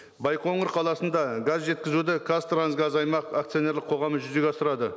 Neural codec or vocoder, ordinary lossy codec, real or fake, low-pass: none; none; real; none